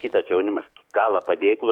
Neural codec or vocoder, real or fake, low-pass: codec, 44.1 kHz, 7.8 kbps, DAC; fake; 19.8 kHz